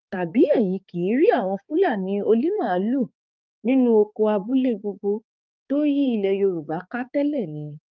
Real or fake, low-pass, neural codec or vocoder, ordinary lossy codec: fake; 7.2 kHz; codec, 16 kHz, 4 kbps, X-Codec, HuBERT features, trained on balanced general audio; Opus, 24 kbps